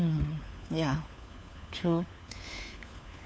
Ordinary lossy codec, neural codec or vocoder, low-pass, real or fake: none; codec, 16 kHz, 4 kbps, FunCodec, trained on LibriTTS, 50 frames a second; none; fake